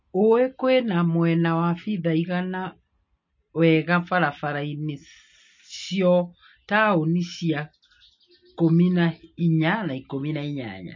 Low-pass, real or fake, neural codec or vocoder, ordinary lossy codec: 7.2 kHz; real; none; MP3, 32 kbps